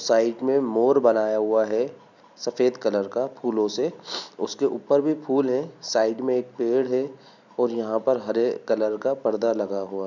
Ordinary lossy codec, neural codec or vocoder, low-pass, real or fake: none; none; 7.2 kHz; real